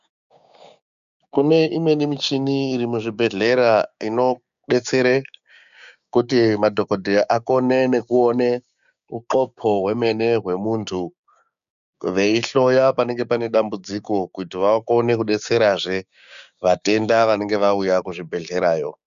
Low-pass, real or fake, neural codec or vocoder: 7.2 kHz; fake; codec, 16 kHz, 6 kbps, DAC